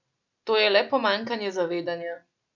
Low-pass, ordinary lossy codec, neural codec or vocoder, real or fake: 7.2 kHz; none; none; real